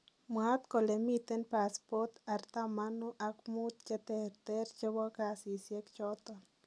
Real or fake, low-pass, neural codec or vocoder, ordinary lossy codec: real; none; none; none